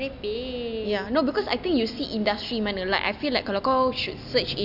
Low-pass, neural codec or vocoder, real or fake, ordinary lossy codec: 5.4 kHz; none; real; none